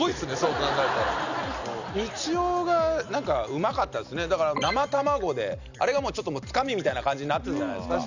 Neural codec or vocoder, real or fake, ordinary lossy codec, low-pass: none; real; none; 7.2 kHz